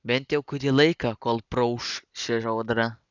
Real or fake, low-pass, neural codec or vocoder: real; 7.2 kHz; none